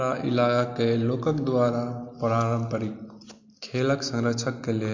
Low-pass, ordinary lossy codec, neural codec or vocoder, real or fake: 7.2 kHz; MP3, 48 kbps; none; real